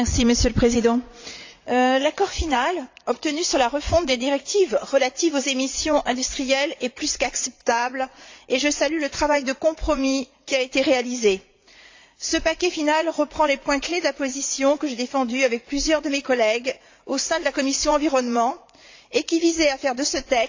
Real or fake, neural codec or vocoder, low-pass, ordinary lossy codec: fake; vocoder, 44.1 kHz, 80 mel bands, Vocos; 7.2 kHz; AAC, 48 kbps